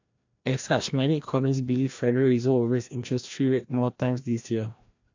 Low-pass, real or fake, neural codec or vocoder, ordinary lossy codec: 7.2 kHz; fake; codec, 16 kHz, 1 kbps, FreqCodec, larger model; AAC, 48 kbps